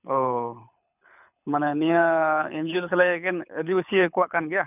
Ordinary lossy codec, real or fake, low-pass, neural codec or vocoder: none; fake; 3.6 kHz; codec, 24 kHz, 6 kbps, HILCodec